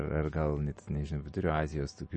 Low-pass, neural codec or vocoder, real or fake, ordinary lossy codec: 9.9 kHz; none; real; MP3, 32 kbps